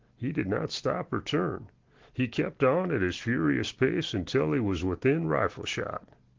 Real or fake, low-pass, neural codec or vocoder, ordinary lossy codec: real; 7.2 kHz; none; Opus, 16 kbps